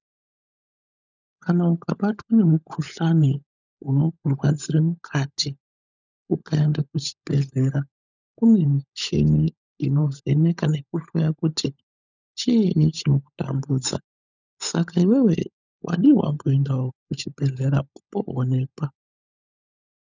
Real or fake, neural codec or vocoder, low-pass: fake; codec, 16 kHz, 16 kbps, FunCodec, trained on LibriTTS, 50 frames a second; 7.2 kHz